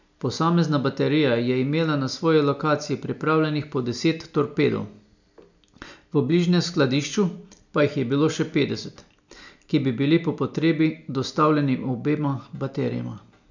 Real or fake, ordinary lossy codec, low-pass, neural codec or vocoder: real; none; 7.2 kHz; none